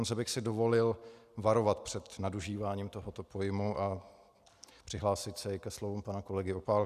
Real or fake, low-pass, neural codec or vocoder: real; 14.4 kHz; none